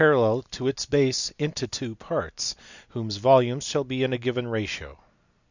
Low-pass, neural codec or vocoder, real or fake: 7.2 kHz; none; real